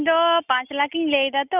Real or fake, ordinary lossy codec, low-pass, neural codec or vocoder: real; none; 3.6 kHz; none